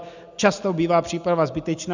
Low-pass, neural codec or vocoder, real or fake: 7.2 kHz; none; real